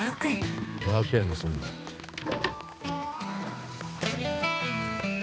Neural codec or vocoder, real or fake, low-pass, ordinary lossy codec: codec, 16 kHz, 2 kbps, X-Codec, HuBERT features, trained on balanced general audio; fake; none; none